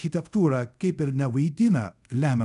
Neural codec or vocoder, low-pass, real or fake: codec, 24 kHz, 0.5 kbps, DualCodec; 10.8 kHz; fake